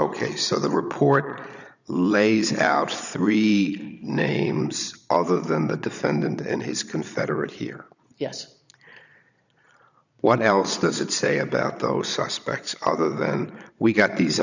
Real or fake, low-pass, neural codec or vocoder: fake; 7.2 kHz; vocoder, 22.05 kHz, 80 mel bands, Vocos